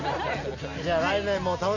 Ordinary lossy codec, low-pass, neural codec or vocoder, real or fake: none; 7.2 kHz; none; real